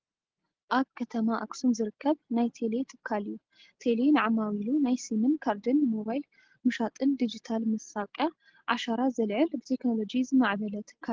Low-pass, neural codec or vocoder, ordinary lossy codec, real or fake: 7.2 kHz; none; Opus, 16 kbps; real